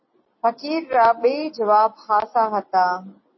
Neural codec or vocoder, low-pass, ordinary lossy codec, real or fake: none; 7.2 kHz; MP3, 24 kbps; real